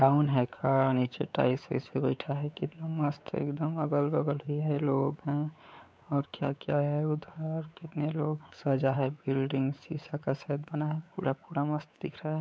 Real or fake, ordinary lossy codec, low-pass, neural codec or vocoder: fake; none; none; codec, 16 kHz, 4 kbps, X-Codec, WavLM features, trained on Multilingual LibriSpeech